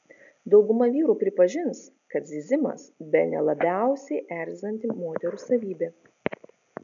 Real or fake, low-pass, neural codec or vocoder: real; 7.2 kHz; none